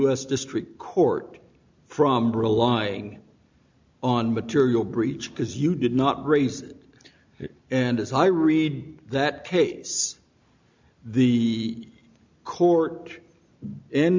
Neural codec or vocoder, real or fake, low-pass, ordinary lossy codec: none; real; 7.2 kHz; MP3, 64 kbps